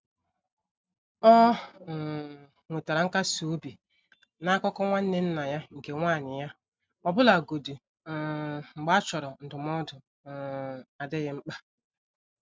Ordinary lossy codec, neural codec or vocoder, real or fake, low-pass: none; none; real; none